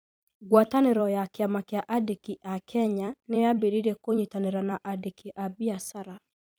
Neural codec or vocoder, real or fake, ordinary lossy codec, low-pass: vocoder, 44.1 kHz, 128 mel bands every 256 samples, BigVGAN v2; fake; none; none